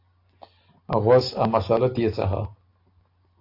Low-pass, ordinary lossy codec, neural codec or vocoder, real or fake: 5.4 kHz; AAC, 32 kbps; none; real